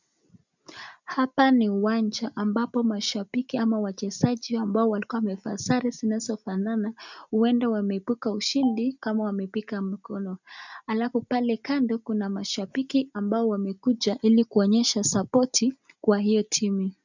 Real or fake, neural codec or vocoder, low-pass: real; none; 7.2 kHz